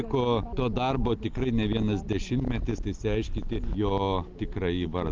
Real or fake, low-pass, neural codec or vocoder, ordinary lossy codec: real; 7.2 kHz; none; Opus, 32 kbps